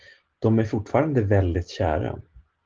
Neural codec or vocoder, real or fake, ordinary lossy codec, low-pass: none; real; Opus, 16 kbps; 7.2 kHz